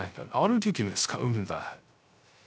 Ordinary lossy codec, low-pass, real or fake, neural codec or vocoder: none; none; fake; codec, 16 kHz, 0.3 kbps, FocalCodec